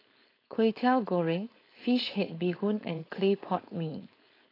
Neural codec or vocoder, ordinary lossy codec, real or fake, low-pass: codec, 16 kHz, 4.8 kbps, FACodec; AAC, 24 kbps; fake; 5.4 kHz